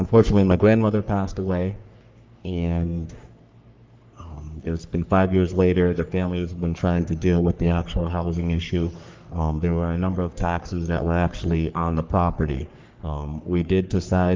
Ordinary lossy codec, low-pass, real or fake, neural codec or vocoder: Opus, 24 kbps; 7.2 kHz; fake; codec, 44.1 kHz, 3.4 kbps, Pupu-Codec